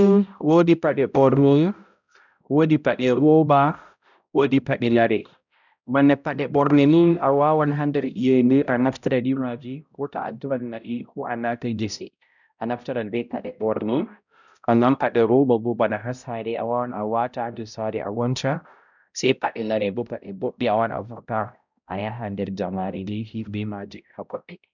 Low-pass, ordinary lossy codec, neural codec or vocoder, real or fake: 7.2 kHz; none; codec, 16 kHz, 0.5 kbps, X-Codec, HuBERT features, trained on balanced general audio; fake